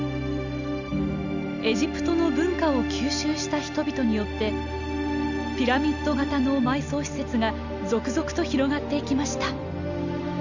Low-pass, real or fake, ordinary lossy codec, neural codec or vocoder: 7.2 kHz; real; none; none